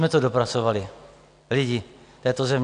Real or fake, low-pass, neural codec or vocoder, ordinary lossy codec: real; 9.9 kHz; none; AAC, 64 kbps